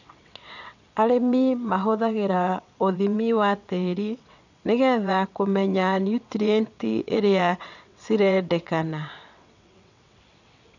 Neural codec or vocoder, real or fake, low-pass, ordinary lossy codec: vocoder, 22.05 kHz, 80 mel bands, WaveNeXt; fake; 7.2 kHz; none